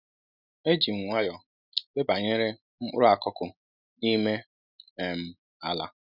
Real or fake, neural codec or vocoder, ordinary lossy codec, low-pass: real; none; none; 5.4 kHz